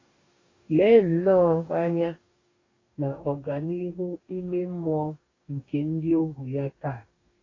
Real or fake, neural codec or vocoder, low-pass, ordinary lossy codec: fake; codec, 44.1 kHz, 2.6 kbps, DAC; 7.2 kHz; AAC, 32 kbps